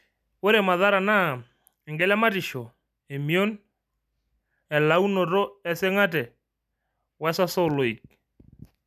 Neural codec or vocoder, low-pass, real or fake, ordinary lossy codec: none; 14.4 kHz; real; none